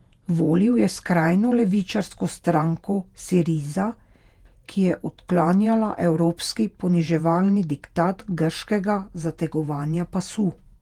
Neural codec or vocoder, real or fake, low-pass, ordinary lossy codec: vocoder, 48 kHz, 128 mel bands, Vocos; fake; 19.8 kHz; Opus, 24 kbps